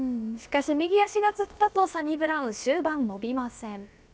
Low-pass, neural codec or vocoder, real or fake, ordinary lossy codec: none; codec, 16 kHz, about 1 kbps, DyCAST, with the encoder's durations; fake; none